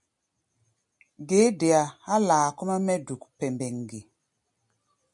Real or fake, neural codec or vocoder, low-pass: real; none; 10.8 kHz